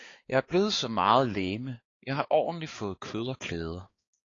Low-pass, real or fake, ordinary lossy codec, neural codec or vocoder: 7.2 kHz; fake; AAC, 32 kbps; codec, 16 kHz, 4 kbps, X-Codec, HuBERT features, trained on balanced general audio